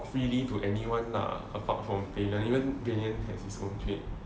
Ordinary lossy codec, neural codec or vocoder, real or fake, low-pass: none; none; real; none